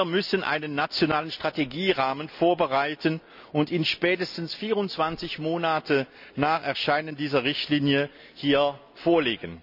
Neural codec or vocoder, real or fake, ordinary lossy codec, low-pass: none; real; none; 5.4 kHz